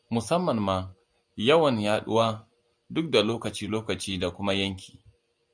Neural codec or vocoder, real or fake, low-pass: none; real; 9.9 kHz